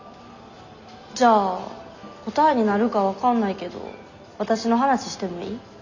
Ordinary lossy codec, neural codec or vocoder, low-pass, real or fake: none; none; 7.2 kHz; real